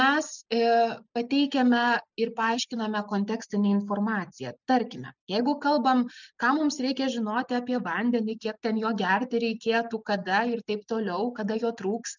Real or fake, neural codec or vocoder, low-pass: real; none; 7.2 kHz